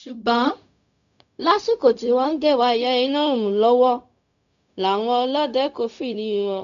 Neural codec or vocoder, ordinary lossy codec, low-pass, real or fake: codec, 16 kHz, 0.4 kbps, LongCat-Audio-Codec; none; 7.2 kHz; fake